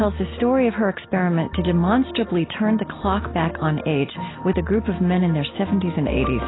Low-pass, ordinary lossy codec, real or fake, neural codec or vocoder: 7.2 kHz; AAC, 16 kbps; real; none